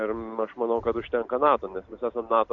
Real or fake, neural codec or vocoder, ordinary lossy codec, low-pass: real; none; AAC, 64 kbps; 7.2 kHz